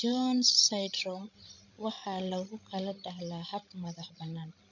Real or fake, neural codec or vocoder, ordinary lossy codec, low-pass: fake; codec, 16 kHz, 16 kbps, FreqCodec, larger model; none; 7.2 kHz